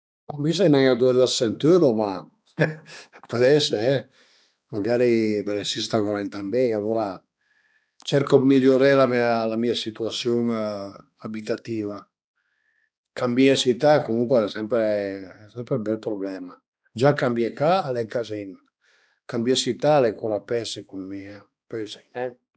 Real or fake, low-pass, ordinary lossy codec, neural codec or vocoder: fake; none; none; codec, 16 kHz, 2 kbps, X-Codec, HuBERT features, trained on balanced general audio